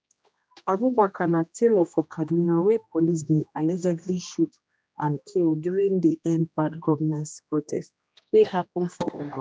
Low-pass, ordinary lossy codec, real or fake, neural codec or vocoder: none; none; fake; codec, 16 kHz, 1 kbps, X-Codec, HuBERT features, trained on general audio